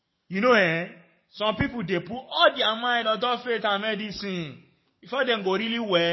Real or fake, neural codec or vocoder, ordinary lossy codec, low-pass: fake; codec, 44.1 kHz, 7.8 kbps, DAC; MP3, 24 kbps; 7.2 kHz